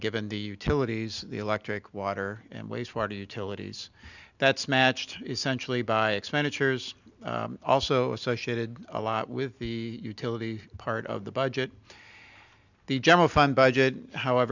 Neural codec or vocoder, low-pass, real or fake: none; 7.2 kHz; real